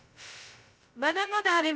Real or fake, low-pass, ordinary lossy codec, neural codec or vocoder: fake; none; none; codec, 16 kHz, 0.2 kbps, FocalCodec